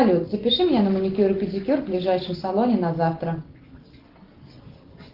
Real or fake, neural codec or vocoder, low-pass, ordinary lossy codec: real; none; 5.4 kHz; Opus, 16 kbps